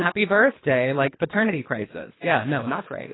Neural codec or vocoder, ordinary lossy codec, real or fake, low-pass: codec, 24 kHz, 3 kbps, HILCodec; AAC, 16 kbps; fake; 7.2 kHz